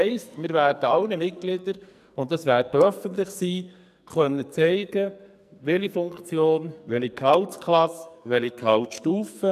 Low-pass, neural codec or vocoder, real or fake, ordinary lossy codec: 14.4 kHz; codec, 44.1 kHz, 2.6 kbps, SNAC; fake; none